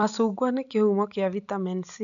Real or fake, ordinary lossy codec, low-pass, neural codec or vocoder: real; none; 7.2 kHz; none